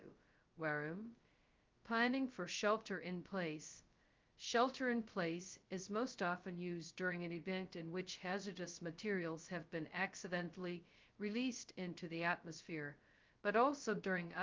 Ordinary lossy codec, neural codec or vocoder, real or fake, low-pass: Opus, 24 kbps; codec, 16 kHz, 0.2 kbps, FocalCodec; fake; 7.2 kHz